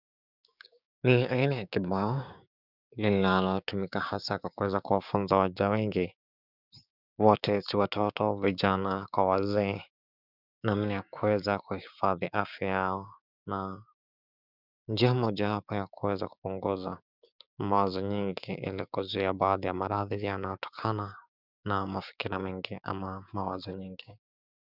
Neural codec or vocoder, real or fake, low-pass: codec, 16 kHz, 6 kbps, DAC; fake; 5.4 kHz